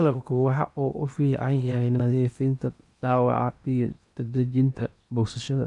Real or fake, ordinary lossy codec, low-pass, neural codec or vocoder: fake; none; 10.8 kHz; codec, 16 kHz in and 24 kHz out, 0.8 kbps, FocalCodec, streaming, 65536 codes